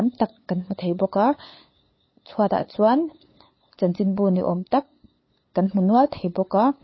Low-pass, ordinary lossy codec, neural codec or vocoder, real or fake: 7.2 kHz; MP3, 24 kbps; codec, 16 kHz, 8 kbps, FunCodec, trained on LibriTTS, 25 frames a second; fake